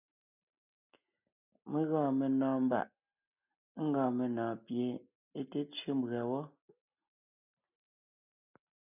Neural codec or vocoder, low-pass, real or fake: none; 3.6 kHz; real